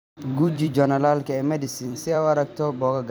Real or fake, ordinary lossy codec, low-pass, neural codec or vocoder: fake; none; none; vocoder, 44.1 kHz, 128 mel bands every 256 samples, BigVGAN v2